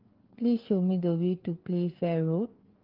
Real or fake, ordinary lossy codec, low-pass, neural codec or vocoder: fake; Opus, 16 kbps; 5.4 kHz; codec, 16 kHz, 4 kbps, FreqCodec, larger model